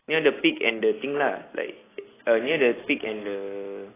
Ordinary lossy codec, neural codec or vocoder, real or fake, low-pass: AAC, 16 kbps; none; real; 3.6 kHz